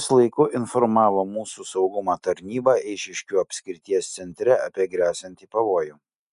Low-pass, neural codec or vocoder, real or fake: 10.8 kHz; none; real